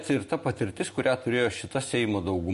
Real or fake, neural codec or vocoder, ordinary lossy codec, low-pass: real; none; MP3, 48 kbps; 14.4 kHz